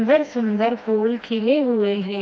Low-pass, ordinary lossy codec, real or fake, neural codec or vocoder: none; none; fake; codec, 16 kHz, 1 kbps, FreqCodec, smaller model